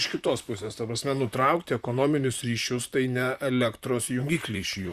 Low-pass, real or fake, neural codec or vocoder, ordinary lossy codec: 14.4 kHz; fake; vocoder, 44.1 kHz, 128 mel bands, Pupu-Vocoder; Opus, 64 kbps